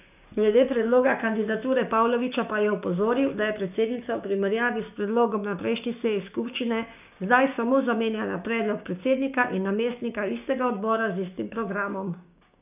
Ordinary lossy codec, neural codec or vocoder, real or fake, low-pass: none; codec, 16 kHz, 6 kbps, DAC; fake; 3.6 kHz